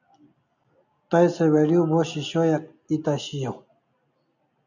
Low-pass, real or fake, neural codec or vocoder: 7.2 kHz; real; none